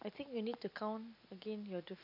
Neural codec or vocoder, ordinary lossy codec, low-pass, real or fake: none; none; 5.4 kHz; real